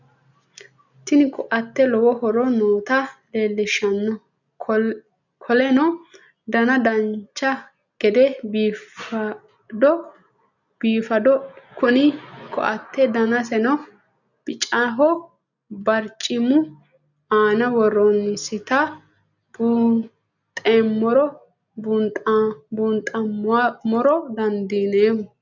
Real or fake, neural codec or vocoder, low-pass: real; none; 7.2 kHz